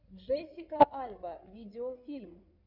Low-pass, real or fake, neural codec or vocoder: 5.4 kHz; fake; codec, 16 kHz in and 24 kHz out, 2.2 kbps, FireRedTTS-2 codec